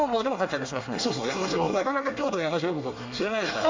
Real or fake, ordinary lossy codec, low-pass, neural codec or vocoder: fake; MP3, 64 kbps; 7.2 kHz; codec, 24 kHz, 1 kbps, SNAC